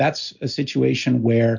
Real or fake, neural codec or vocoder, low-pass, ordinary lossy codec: real; none; 7.2 kHz; MP3, 48 kbps